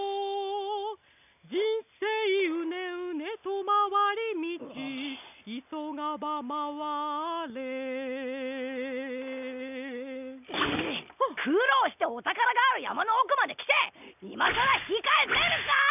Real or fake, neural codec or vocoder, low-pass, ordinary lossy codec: real; none; 3.6 kHz; none